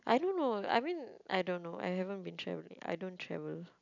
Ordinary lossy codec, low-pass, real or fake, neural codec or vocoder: none; 7.2 kHz; real; none